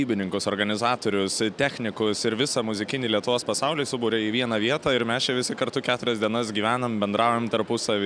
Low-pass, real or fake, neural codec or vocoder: 9.9 kHz; real; none